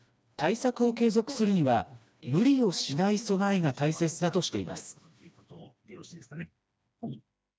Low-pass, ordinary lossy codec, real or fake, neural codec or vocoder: none; none; fake; codec, 16 kHz, 2 kbps, FreqCodec, smaller model